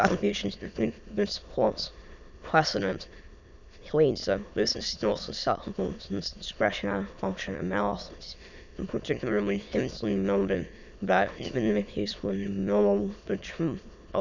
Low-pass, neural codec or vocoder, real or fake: 7.2 kHz; autoencoder, 22.05 kHz, a latent of 192 numbers a frame, VITS, trained on many speakers; fake